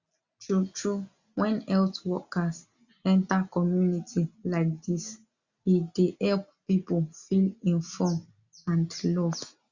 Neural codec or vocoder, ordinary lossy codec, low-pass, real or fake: none; Opus, 64 kbps; 7.2 kHz; real